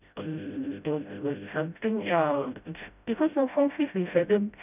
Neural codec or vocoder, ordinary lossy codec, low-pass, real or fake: codec, 16 kHz, 0.5 kbps, FreqCodec, smaller model; none; 3.6 kHz; fake